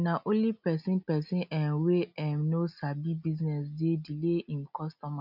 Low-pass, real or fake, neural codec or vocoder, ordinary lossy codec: 5.4 kHz; real; none; none